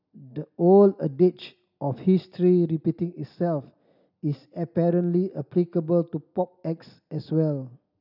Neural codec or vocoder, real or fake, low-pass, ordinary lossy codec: none; real; 5.4 kHz; none